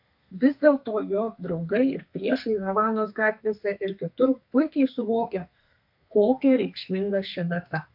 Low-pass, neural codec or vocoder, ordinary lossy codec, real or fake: 5.4 kHz; codec, 32 kHz, 1.9 kbps, SNAC; AAC, 48 kbps; fake